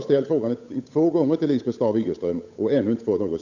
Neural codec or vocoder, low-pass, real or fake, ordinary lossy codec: codec, 16 kHz, 8 kbps, FunCodec, trained on Chinese and English, 25 frames a second; 7.2 kHz; fake; none